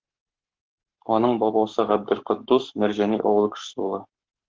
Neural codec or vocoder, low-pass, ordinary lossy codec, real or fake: codec, 44.1 kHz, 7.8 kbps, Pupu-Codec; 7.2 kHz; Opus, 16 kbps; fake